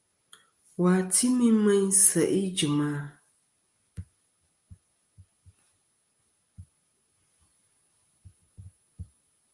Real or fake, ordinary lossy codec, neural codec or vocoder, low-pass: real; Opus, 32 kbps; none; 10.8 kHz